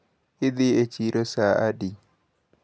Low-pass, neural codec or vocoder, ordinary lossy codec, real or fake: none; none; none; real